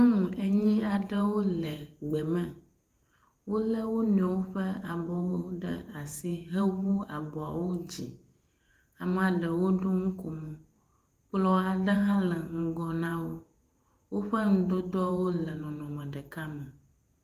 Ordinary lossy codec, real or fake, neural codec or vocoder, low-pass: Opus, 32 kbps; fake; vocoder, 48 kHz, 128 mel bands, Vocos; 14.4 kHz